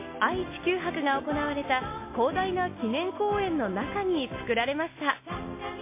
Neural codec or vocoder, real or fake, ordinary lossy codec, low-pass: none; real; MP3, 24 kbps; 3.6 kHz